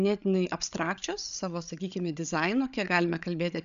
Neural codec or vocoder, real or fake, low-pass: codec, 16 kHz, 8 kbps, FreqCodec, larger model; fake; 7.2 kHz